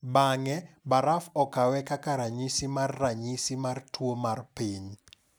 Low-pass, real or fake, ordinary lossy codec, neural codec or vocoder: none; real; none; none